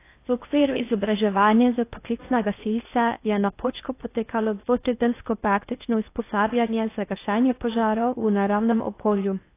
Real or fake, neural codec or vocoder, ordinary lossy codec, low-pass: fake; codec, 16 kHz in and 24 kHz out, 0.6 kbps, FocalCodec, streaming, 4096 codes; AAC, 24 kbps; 3.6 kHz